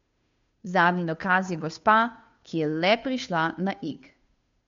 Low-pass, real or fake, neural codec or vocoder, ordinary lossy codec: 7.2 kHz; fake; codec, 16 kHz, 2 kbps, FunCodec, trained on Chinese and English, 25 frames a second; MP3, 48 kbps